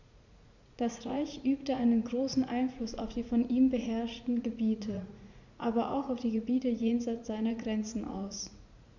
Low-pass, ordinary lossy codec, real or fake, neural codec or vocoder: 7.2 kHz; none; fake; vocoder, 44.1 kHz, 80 mel bands, Vocos